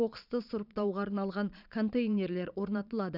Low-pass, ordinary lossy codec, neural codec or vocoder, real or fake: 5.4 kHz; none; vocoder, 22.05 kHz, 80 mel bands, Vocos; fake